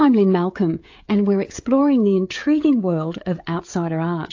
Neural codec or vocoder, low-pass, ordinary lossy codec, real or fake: codec, 16 kHz, 16 kbps, FreqCodec, smaller model; 7.2 kHz; AAC, 48 kbps; fake